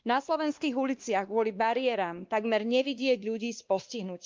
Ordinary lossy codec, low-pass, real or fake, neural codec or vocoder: Opus, 32 kbps; 7.2 kHz; fake; codec, 16 kHz, 2 kbps, X-Codec, WavLM features, trained on Multilingual LibriSpeech